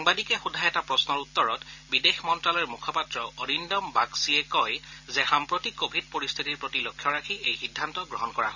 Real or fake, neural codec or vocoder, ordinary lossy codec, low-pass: real; none; none; 7.2 kHz